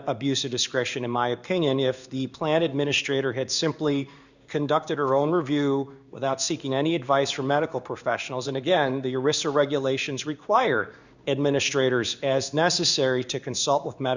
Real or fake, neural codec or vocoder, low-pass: fake; codec, 16 kHz in and 24 kHz out, 1 kbps, XY-Tokenizer; 7.2 kHz